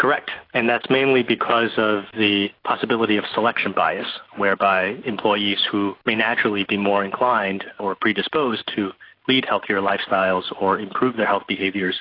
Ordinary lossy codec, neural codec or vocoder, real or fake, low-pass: AAC, 32 kbps; none; real; 5.4 kHz